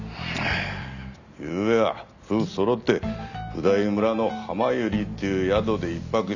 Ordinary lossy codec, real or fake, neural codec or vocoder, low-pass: none; real; none; 7.2 kHz